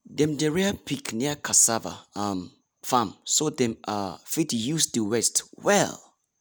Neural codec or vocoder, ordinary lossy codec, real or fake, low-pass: none; none; real; none